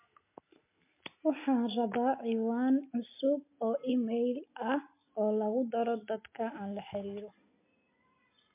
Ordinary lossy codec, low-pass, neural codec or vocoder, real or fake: MP3, 24 kbps; 3.6 kHz; none; real